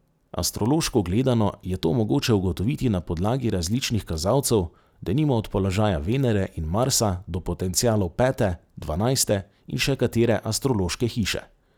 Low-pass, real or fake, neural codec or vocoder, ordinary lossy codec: none; real; none; none